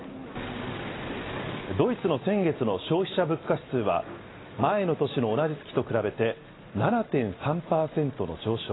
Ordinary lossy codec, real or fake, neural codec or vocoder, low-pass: AAC, 16 kbps; real; none; 7.2 kHz